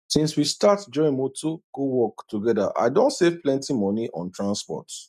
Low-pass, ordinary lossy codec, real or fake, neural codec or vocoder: 14.4 kHz; none; real; none